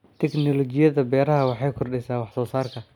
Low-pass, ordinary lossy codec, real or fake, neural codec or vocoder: 19.8 kHz; none; real; none